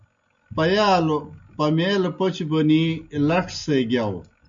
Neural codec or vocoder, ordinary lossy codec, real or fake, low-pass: none; MP3, 96 kbps; real; 7.2 kHz